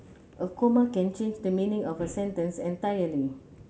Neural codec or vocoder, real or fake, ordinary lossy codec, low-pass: none; real; none; none